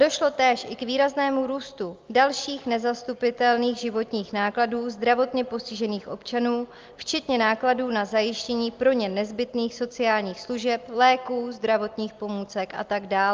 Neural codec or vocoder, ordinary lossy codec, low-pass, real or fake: none; Opus, 32 kbps; 7.2 kHz; real